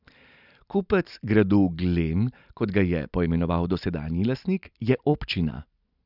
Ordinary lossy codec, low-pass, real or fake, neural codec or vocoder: none; 5.4 kHz; real; none